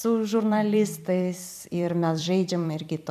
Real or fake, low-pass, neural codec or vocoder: fake; 14.4 kHz; vocoder, 44.1 kHz, 128 mel bands every 256 samples, BigVGAN v2